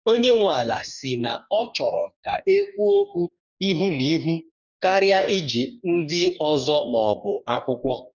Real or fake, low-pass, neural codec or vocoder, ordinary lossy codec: fake; 7.2 kHz; codec, 44.1 kHz, 2.6 kbps, DAC; none